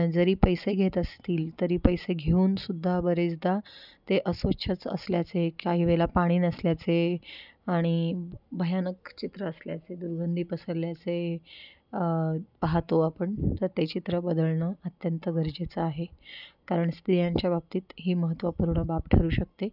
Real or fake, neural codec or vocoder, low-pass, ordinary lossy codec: real; none; 5.4 kHz; none